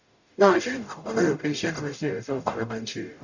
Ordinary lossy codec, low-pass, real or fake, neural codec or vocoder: none; 7.2 kHz; fake; codec, 44.1 kHz, 0.9 kbps, DAC